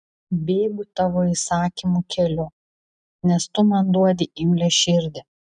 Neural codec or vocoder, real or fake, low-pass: none; real; 9.9 kHz